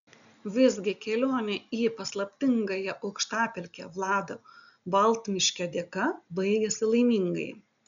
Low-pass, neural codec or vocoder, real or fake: 7.2 kHz; none; real